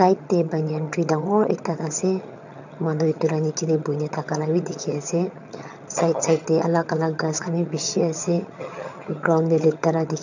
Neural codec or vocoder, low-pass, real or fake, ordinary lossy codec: vocoder, 22.05 kHz, 80 mel bands, HiFi-GAN; 7.2 kHz; fake; none